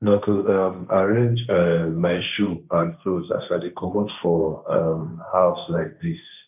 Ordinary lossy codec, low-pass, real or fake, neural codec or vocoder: none; 3.6 kHz; fake; codec, 16 kHz, 1.1 kbps, Voila-Tokenizer